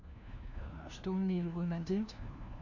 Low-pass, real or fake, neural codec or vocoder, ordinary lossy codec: 7.2 kHz; fake; codec, 16 kHz, 1 kbps, FunCodec, trained on LibriTTS, 50 frames a second; none